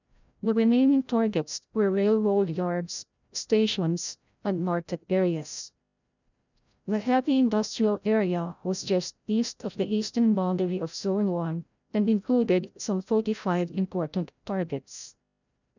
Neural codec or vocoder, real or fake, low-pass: codec, 16 kHz, 0.5 kbps, FreqCodec, larger model; fake; 7.2 kHz